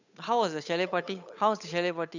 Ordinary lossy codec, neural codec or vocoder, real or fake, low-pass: none; codec, 16 kHz, 8 kbps, FunCodec, trained on Chinese and English, 25 frames a second; fake; 7.2 kHz